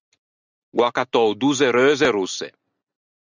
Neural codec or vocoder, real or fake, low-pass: none; real; 7.2 kHz